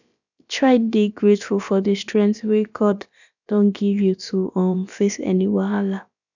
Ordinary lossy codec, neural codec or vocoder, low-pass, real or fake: none; codec, 16 kHz, about 1 kbps, DyCAST, with the encoder's durations; 7.2 kHz; fake